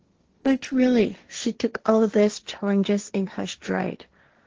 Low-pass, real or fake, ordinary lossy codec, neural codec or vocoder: 7.2 kHz; fake; Opus, 16 kbps; codec, 16 kHz, 1.1 kbps, Voila-Tokenizer